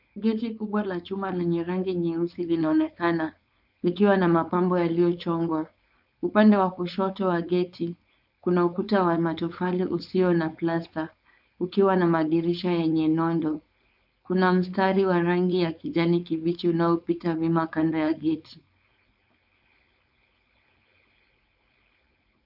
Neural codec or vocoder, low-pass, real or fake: codec, 16 kHz, 4.8 kbps, FACodec; 5.4 kHz; fake